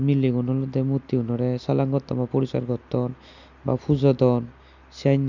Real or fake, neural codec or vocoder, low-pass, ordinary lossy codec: real; none; 7.2 kHz; none